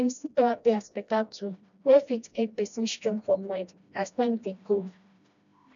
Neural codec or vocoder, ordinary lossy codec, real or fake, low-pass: codec, 16 kHz, 1 kbps, FreqCodec, smaller model; none; fake; 7.2 kHz